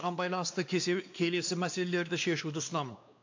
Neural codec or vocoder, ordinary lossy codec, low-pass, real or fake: codec, 16 kHz, 2 kbps, X-Codec, WavLM features, trained on Multilingual LibriSpeech; AAC, 48 kbps; 7.2 kHz; fake